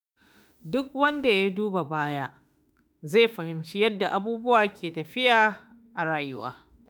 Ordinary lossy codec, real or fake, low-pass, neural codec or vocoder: none; fake; none; autoencoder, 48 kHz, 32 numbers a frame, DAC-VAE, trained on Japanese speech